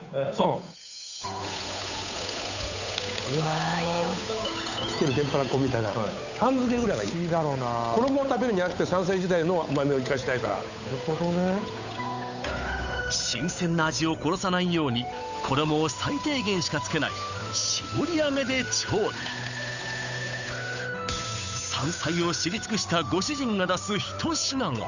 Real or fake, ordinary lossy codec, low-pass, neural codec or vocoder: fake; none; 7.2 kHz; codec, 16 kHz, 8 kbps, FunCodec, trained on Chinese and English, 25 frames a second